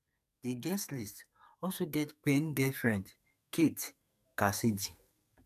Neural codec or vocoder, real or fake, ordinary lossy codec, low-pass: codec, 44.1 kHz, 2.6 kbps, SNAC; fake; none; 14.4 kHz